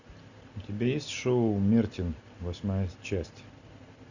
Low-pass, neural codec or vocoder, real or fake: 7.2 kHz; none; real